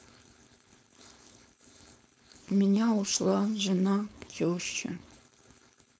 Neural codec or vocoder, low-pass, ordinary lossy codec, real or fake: codec, 16 kHz, 4.8 kbps, FACodec; none; none; fake